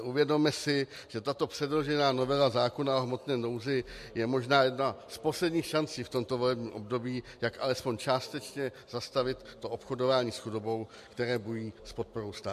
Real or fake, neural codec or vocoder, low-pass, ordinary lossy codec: real; none; 14.4 kHz; MP3, 64 kbps